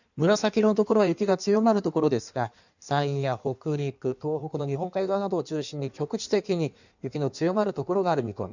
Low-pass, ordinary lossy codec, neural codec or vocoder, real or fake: 7.2 kHz; none; codec, 16 kHz in and 24 kHz out, 1.1 kbps, FireRedTTS-2 codec; fake